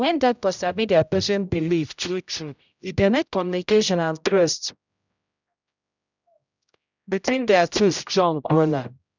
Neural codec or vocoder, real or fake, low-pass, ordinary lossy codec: codec, 16 kHz, 0.5 kbps, X-Codec, HuBERT features, trained on general audio; fake; 7.2 kHz; none